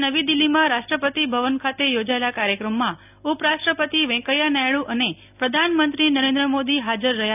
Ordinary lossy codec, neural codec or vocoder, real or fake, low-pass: none; none; real; 3.6 kHz